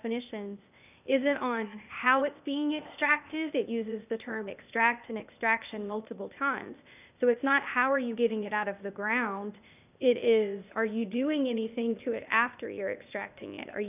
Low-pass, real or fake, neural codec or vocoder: 3.6 kHz; fake; codec, 16 kHz, 0.8 kbps, ZipCodec